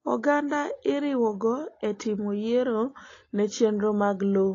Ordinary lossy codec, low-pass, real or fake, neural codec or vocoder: AAC, 32 kbps; 7.2 kHz; real; none